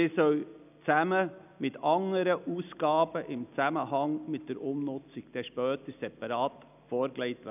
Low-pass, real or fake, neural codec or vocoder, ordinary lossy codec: 3.6 kHz; real; none; none